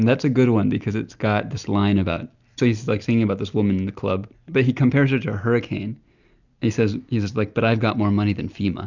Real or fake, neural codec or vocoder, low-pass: real; none; 7.2 kHz